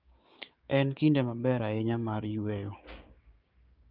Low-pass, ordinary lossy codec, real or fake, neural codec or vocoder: 5.4 kHz; Opus, 24 kbps; fake; codec, 16 kHz, 6 kbps, DAC